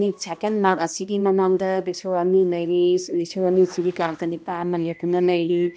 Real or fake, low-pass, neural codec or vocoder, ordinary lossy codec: fake; none; codec, 16 kHz, 1 kbps, X-Codec, HuBERT features, trained on balanced general audio; none